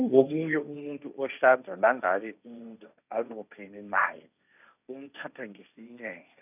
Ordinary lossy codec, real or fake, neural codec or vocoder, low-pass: none; fake; codec, 16 kHz, 1.1 kbps, Voila-Tokenizer; 3.6 kHz